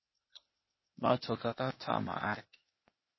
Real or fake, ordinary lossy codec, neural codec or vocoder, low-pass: fake; MP3, 24 kbps; codec, 16 kHz, 0.8 kbps, ZipCodec; 7.2 kHz